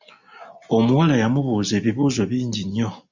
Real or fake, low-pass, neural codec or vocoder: real; 7.2 kHz; none